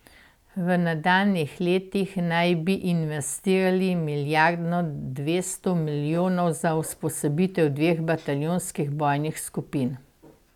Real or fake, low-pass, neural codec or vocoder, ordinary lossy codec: real; 19.8 kHz; none; none